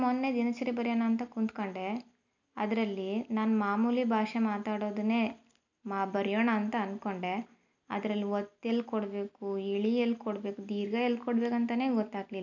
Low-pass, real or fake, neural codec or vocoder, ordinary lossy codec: 7.2 kHz; real; none; none